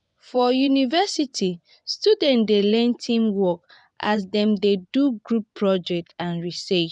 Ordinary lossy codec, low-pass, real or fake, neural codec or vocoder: none; 10.8 kHz; fake; vocoder, 24 kHz, 100 mel bands, Vocos